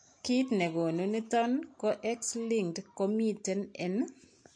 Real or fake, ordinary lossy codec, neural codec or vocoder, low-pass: real; MP3, 48 kbps; none; 9.9 kHz